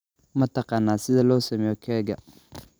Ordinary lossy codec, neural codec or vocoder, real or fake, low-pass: none; none; real; none